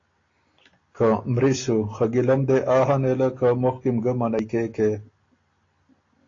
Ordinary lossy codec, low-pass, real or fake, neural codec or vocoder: AAC, 32 kbps; 7.2 kHz; real; none